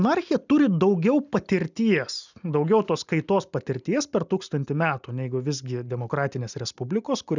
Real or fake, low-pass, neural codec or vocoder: real; 7.2 kHz; none